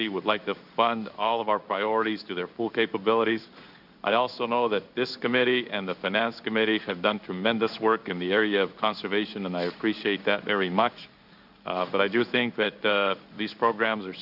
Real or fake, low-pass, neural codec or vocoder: fake; 5.4 kHz; codec, 16 kHz in and 24 kHz out, 1 kbps, XY-Tokenizer